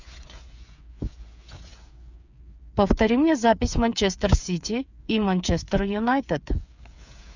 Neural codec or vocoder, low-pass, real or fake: codec, 16 kHz, 8 kbps, FreqCodec, smaller model; 7.2 kHz; fake